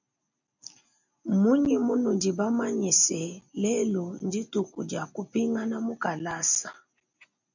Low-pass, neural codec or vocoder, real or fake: 7.2 kHz; none; real